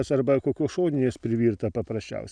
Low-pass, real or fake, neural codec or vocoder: 9.9 kHz; real; none